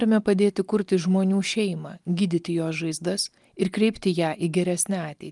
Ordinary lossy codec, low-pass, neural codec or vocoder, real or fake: Opus, 32 kbps; 10.8 kHz; none; real